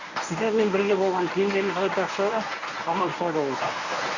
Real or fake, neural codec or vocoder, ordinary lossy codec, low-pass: fake; codec, 24 kHz, 0.9 kbps, WavTokenizer, medium speech release version 1; none; 7.2 kHz